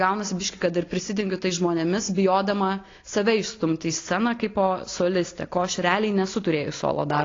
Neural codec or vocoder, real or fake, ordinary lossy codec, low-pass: none; real; AAC, 32 kbps; 7.2 kHz